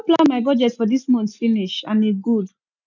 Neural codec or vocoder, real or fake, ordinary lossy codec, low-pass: none; real; none; 7.2 kHz